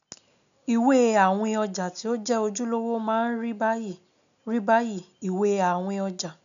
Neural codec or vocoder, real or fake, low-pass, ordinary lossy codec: none; real; 7.2 kHz; none